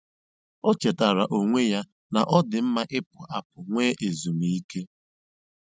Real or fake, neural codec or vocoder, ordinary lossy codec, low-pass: real; none; none; none